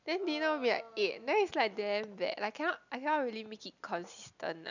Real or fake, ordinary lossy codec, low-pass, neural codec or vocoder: real; none; 7.2 kHz; none